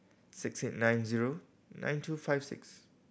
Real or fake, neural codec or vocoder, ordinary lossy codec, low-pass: real; none; none; none